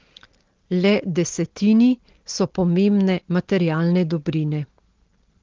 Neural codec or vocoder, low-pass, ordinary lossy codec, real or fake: none; 7.2 kHz; Opus, 16 kbps; real